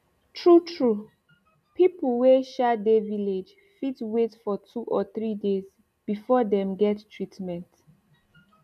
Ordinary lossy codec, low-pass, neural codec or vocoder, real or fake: none; 14.4 kHz; none; real